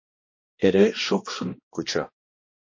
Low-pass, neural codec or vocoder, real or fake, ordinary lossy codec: 7.2 kHz; codec, 16 kHz, 1 kbps, X-Codec, HuBERT features, trained on balanced general audio; fake; MP3, 32 kbps